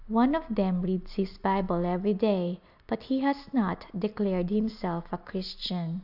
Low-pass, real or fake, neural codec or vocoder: 5.4 kHz; real; none